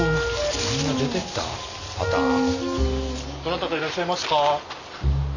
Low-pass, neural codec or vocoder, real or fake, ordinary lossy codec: 7.2 kHz; none; real; none